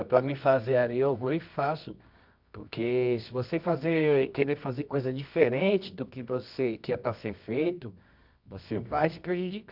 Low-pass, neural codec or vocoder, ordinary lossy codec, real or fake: 5.4 kHz; codec, 24 kHz, 0.9 kbps, WavTokenizer, medium music audio release; none; fake